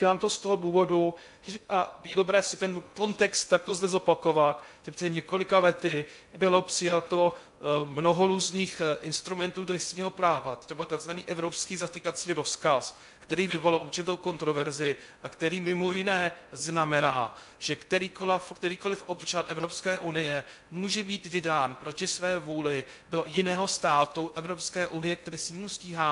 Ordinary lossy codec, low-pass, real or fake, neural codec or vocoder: AAC, 64 kbps; 10.8 kHz; fake; codec, 16 kHz in and 24 kHz out, 0.6 kbps, FocalCodec, streaming, 2048 codes